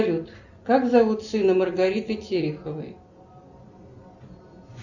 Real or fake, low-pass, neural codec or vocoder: real; 7.2 kHz; none